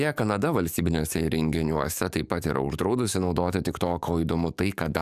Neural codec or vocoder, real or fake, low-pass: codec, 44.1 kHz, 7.8 kbps, DAC; fake; 14.4 kHz